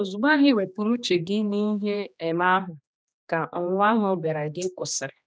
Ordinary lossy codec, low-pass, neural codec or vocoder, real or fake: none; none; codec, 16 kHz, 2 kbps, X-Codec, HuBERT features, trained on general audio; fake